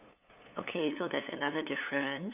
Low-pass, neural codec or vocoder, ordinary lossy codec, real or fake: 3.6 kHz; codec, 16 kHz, 8 kbps, FunCodec, trained on LibriTTS, 25 frames a second; none; fake